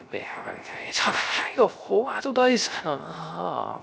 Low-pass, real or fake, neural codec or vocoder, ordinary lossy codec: none; fake; codec, 16 kHz, 0.3 kbps, FocalCodec; none